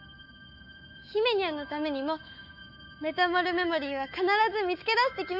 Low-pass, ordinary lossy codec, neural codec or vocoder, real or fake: 5.4 kHz; none; autoencoder, 48 kHz, 128 numbers a frame, DAC-VAE, trained on Japanese speech; fake